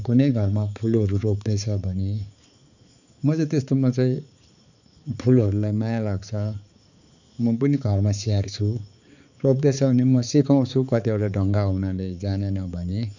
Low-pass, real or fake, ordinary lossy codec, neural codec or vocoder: 7.2 kHz; fake; none; codec, 16 kHz, 4 kbps, X-Codec, HuBERT features, trained on balanced general audio